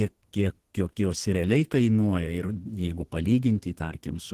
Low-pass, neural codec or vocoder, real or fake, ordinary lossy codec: 14.4 kHz; codec, 32 kHz, 1.9 kbps, SNAC; fake; Opus, 16 kbps